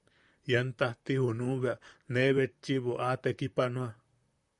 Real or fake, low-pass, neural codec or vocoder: fake; 10.8 kHz; vocoder, 44.1 kHz, 128 mel bands, Pupu-Vocoder